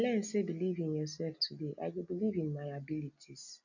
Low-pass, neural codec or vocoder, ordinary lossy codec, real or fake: 7.2 kHz; none; none; real